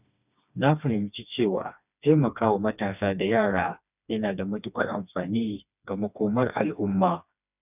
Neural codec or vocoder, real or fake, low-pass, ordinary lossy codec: codec, 16 kHz, 2 kbps, FreqCodec, smaller model; fake; 3.6 kHz; none